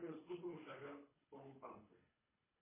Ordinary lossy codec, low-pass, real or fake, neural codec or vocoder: MP3, 16 kbps; 3.6 kHz; fake; codec, 24 kHz, 3 kbps, HILCodec